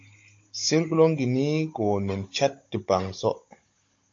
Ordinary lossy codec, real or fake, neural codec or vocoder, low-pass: AAC, 48 kbps; fake; codec, 16 kHz, 16 kbps, FunCodec, trained on Chinese and English, 50 frames a second; 7.2 kHz